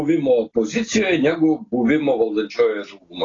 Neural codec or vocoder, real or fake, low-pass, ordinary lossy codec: none; real; 7.2 kHz; AAC, 32 kbps